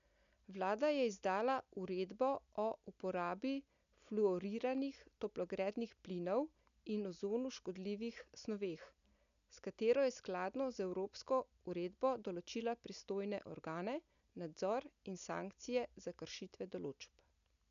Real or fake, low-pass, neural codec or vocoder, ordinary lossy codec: real; 7.2 kHz; none; none